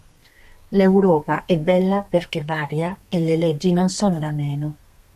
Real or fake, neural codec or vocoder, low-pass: fake; codec, 32 kHz, 1.9 kbps, SNAC; 14.4 kHz